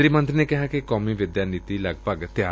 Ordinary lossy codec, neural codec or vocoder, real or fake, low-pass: none; none; real; none